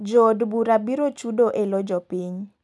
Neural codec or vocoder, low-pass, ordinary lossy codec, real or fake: none; none; none; real